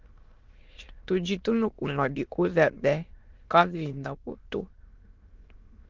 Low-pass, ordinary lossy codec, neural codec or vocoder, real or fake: 7.2 kHz; Opus, 32 kbps; autoencoder, 22.05 kHz, a latent of 192 numbers a frame, VITS, trained on many speakers; fake